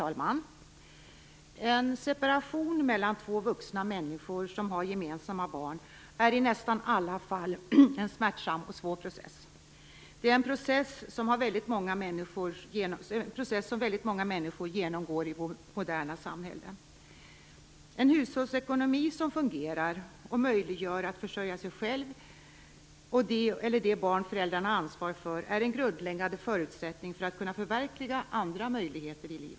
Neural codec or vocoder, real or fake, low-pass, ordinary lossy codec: none; real; none; none